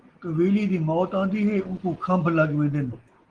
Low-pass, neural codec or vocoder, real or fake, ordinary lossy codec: 9.9 kHz; none; real; Opus, 16 kbps